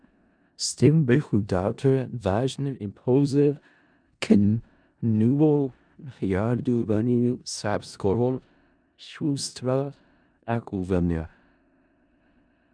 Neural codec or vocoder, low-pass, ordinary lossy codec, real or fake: codec, 16 kHz in and 24 kHz out, 0.4 kbps, LongCat-Audio-Codec, four codebook decoder; 9.9 kHz; MP3, 96 kbps; fake